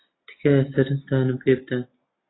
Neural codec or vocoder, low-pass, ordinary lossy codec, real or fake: none; 7.2 kHz; AAC, 16 kbps; real